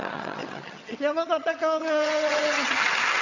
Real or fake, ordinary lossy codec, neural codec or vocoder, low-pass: fake; none; vocoder, 22.05 kHz, 80 mel bands, HiFi-GAN; 7.2 kHz